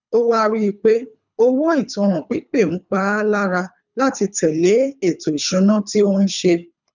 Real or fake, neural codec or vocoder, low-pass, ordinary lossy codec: fake; codec, 24 kHz, 3 kbps, HILCodec; 7.2 kHz; none